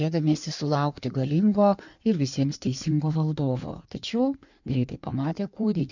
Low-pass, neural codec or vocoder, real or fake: 7.2 kHz; codec, 16 kHz in and 24 kHz out, 1.1 kbps, FireRedTTS-2 codec; fake